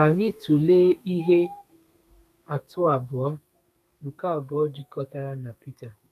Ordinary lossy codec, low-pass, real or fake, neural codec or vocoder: none; 14.4 kHz; fake; codec, 32 kHz, 1.9 kbps, SNAC